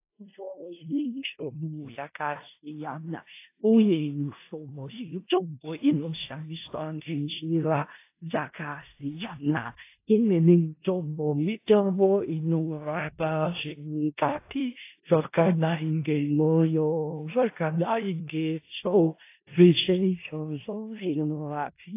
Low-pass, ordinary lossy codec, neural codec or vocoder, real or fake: 3.6 kHz; AAC, 24 kbps; codec, 16 kHz in and 24 kHz out, 0.4 kbps, LongCat-Audio-Codec, four codebook decoder; fake